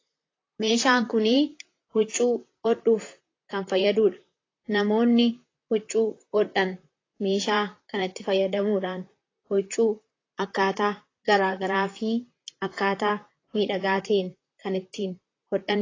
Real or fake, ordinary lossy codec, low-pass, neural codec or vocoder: fake; AAC, 32 kbps; 7.2 kHz; vocoder, 44.1 kHz, 128 mel bands, Pupu-Vocoder